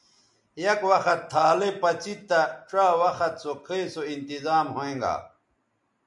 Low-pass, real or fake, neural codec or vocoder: 10.8 kHz; real; none